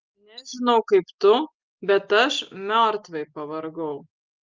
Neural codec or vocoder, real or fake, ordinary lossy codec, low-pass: none; real; Opus, 32 kbps; 7.2 kHz